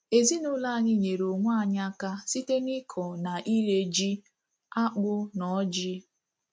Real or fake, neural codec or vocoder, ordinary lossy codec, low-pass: real; none; none; none